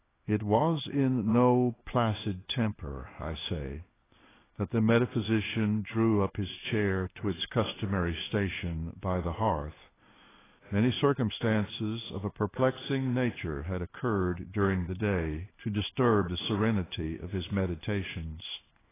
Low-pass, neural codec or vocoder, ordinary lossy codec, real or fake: 3.6 kHz; codec, 16 kHz in and 24 kHz out, 1 kbps, XY-Tokenizer; AAC, 16 kbps; fake